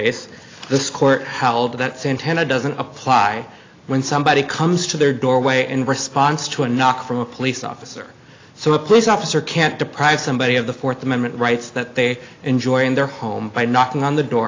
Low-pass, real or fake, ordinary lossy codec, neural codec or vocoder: 7.2 kHz; real; AAC, 32 kbps; none